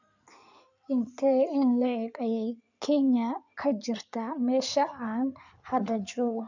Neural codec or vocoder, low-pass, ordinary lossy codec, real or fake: codec, 16 kHz in and 24 kHz out, 2.2 kbps, FireRedTTS-2 codec; 7.2 kHz; none; fake